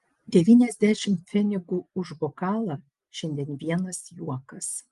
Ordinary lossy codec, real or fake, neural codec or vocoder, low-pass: Opus, 32 kbps; real; none; 10.8 kHz